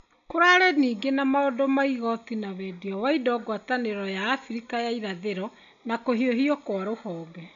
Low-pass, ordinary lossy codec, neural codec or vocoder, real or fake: 7.2 kHz; none; none; real